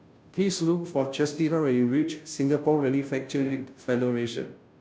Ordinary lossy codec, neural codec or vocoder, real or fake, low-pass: none; codec, 16 kHz, 0.5 kbps, FunCodec, trained on Chinese and English, 25 frames a second; fake; none